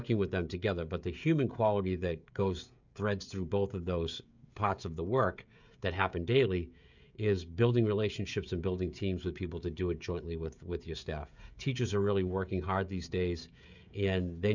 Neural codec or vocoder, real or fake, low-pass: codec, 16 kHz, 16 kbps, FreqCodec, smaller model; fake; 7.2 kHz